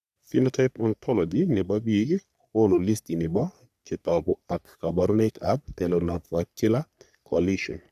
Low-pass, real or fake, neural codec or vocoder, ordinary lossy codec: 14.4 kHz; fake; codec, 44.1 kHz, 3.4 kbps, Pupu-Codec; none